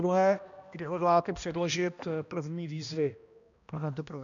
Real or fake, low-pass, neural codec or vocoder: fake; 7.2 kHz; codec, 16 kHz, 1 kbps, X-Codec, HuBERT features, trained on balanced general audio